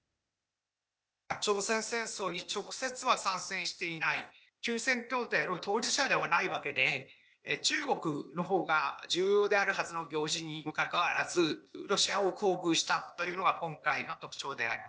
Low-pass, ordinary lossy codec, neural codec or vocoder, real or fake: none; none; codec, 16 kHz, 0.8 kbps, ZipCodec; fake